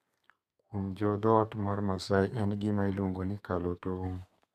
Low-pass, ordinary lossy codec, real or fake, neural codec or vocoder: 14.4 kHz; none; fake; codec, 32 kHz, 1.9 kbps, SNAC